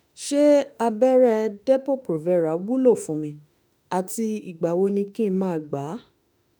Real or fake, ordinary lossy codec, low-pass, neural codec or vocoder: fake; none; none; autoencoder, 48 kHz, 32 numbers a frame, DAC-VAE, trained on Japanese speech